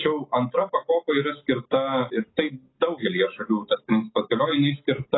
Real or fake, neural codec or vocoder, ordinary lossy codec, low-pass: real; none; AAC, 16 kbps; 7.2 kHz